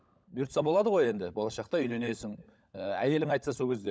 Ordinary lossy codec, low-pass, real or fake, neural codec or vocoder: none; none; fake; codec, 16 kHz, 16 kbps, FunCodec, trained on LibriTTS, 50 frames a second